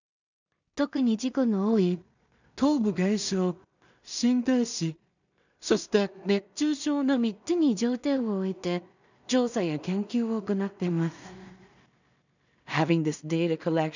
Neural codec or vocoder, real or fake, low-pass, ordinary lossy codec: codec, 16 kHz in and 24 kHz out, 0.4 kbps, LongCat-Audio-Codec, two codebook decoder; fake; 7.2 kHz; none